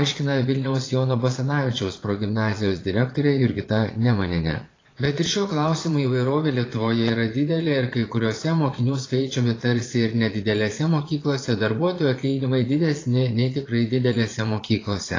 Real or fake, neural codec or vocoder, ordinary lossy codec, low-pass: fake; vocoder, 22.05 kHz, 80 mel bands, Vocos; AAC, 32 kbps; 7.2 kHz